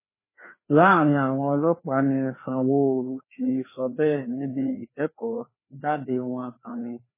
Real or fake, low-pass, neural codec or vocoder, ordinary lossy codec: fake; 3.6 kHz; codec, 16 kHz, 2 kbps, FreqCodec, larger model; MP3, 16 kbps